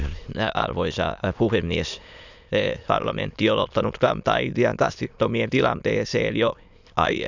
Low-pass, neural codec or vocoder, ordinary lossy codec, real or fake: 7.2 kHz; autoencoder, 22.05 kHz, a latent of 192 numbers a frame, VITS, trained on many speakers; none; fake